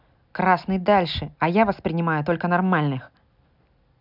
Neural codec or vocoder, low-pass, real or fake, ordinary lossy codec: none; 5.4 kHz; real; none